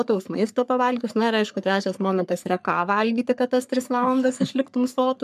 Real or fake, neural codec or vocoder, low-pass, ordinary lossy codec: fake; codec, 44.1 kHz, 3.4 kbps, Pupu-Codec; 14.4 kHz; MP3, 96 kbps